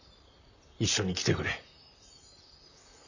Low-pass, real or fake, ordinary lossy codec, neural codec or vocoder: 7.2 kHz; fake; none; vocoder, 44.1 kHz, 128 mel bands, Pupu-Vocoder